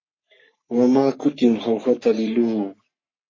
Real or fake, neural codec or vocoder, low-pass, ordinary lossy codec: fake; codec, 44.1 kHz, 3.4 kbps, Pupu-Codec; 7.2 kHz; MP3, 32 kbps